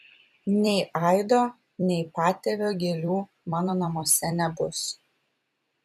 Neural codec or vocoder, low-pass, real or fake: vocoder, 44.1 kHz, 128 mel bands every 256 samples, BigVGAN v2; 14.4 kHz; fake